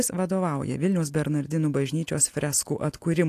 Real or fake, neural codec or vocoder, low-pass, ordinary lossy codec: real; none; 14.4 kHz; AAC, 64 kbps